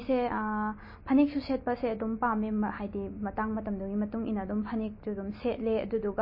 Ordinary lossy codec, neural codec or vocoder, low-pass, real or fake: MP3, 24 kbps; none; 5.4 kHz; real